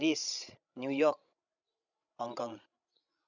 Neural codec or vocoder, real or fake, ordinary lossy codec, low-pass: codec, 16 kHz, 16 kbps, FreqCodec, larger model; fake; none; 7.2 kHz